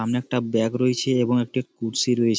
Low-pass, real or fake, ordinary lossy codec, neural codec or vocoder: none; real; none; none